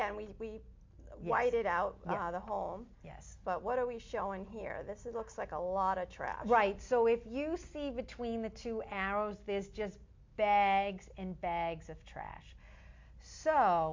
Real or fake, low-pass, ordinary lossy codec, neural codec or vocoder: real; 7.2 kHz; MP3, 48 kbps; none